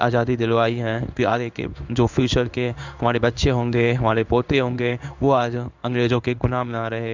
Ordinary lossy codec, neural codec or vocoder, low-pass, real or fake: none; codec, 16 kHz in and 24 kHz out, 1 kbps, XY-Tokenizer; 7.2 kHz; fake